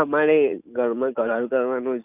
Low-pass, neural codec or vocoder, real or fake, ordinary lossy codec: 3.6 kHz; none; real; none